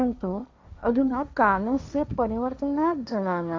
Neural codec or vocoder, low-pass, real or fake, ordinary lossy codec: codec, 16 kHz, 1.1 kbps, Voila-Tokenizer; none; fake; none